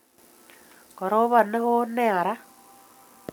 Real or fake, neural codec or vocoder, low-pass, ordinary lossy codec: real; none; none; none